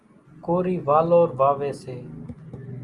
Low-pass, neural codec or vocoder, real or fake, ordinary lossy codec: 10.8 kHz; none; real; Opus, 32 kbps